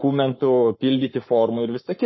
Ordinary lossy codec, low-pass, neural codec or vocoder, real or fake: MP3, 24 kbps; 7.2 kHz; codec, 44.1 kHz, 7.8 kbps, Pupu-Codec; fake